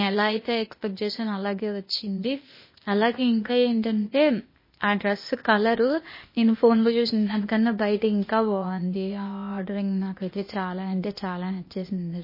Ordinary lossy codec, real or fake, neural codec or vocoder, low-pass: MP3, 24 kbps; fake; codec, 16 kHz, 0.8 kbps, ZipCodec; 5.4 kHz